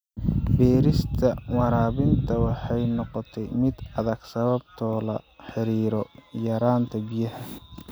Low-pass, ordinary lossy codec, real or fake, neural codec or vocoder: none; none; real; none